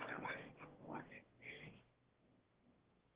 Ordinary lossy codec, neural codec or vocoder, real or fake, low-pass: Opus, 16 kbps; autoencoder, 22.05 kHz, a latent of 192 numbers a frame, VITS, trained on one speaker; fake; 3.6 kHz